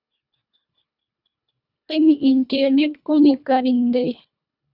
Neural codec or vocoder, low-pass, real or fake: codec, 24 kHz, 1.5 kbps, HILCodec; 5.4 kHz; fake